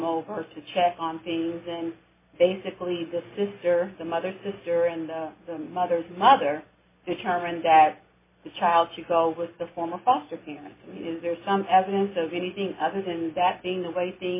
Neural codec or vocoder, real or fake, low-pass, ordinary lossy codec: none; real; 3.6 kHz; MP3, 16 kbps